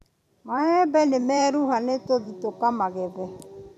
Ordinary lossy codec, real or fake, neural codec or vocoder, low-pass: none; fake; vocoder, 44.1 kHz, 128 mel bands every 512 samples, BigVGAN v2; 14.4 kHz